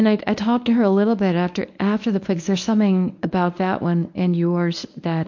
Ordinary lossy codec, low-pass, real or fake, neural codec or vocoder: MP3, 48 kbps; 7.2 kHz; fake; codec, 24 kHz, 0.9 kbps, WavTokenizer, medium speech release version 1